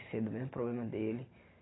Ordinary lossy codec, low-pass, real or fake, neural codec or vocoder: AAC, 16 kbps; 7.2 kHz; real; none